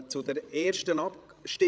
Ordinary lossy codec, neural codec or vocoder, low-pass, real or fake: none; codec, 16 kHz, 16 kbps, FreqCodec, larger model; none; fake